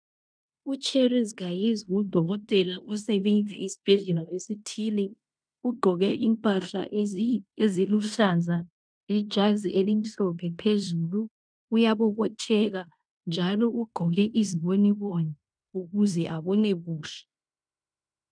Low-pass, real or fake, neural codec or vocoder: 9.9 kHz; fake; codec, 16 kHz in and 24 kHz out, 0.9 kbps, LongCat-Audio-Codec, fine tuned four codebook decoder